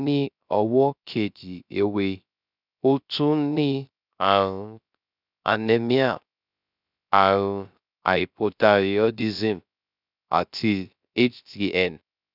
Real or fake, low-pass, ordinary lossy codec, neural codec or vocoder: fake; 5.4 kHz; none; codec, 16 kHz, 0.3 kbps, FocalCodec